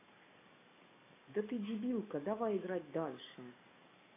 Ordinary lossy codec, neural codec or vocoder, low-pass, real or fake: AAC, 32 kbps; codec, 44.1 kHz, 7.8 kbps, DAC; 3.6 kHz; fake